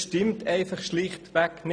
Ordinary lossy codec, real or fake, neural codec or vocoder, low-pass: none; real; none; 9.9 kHz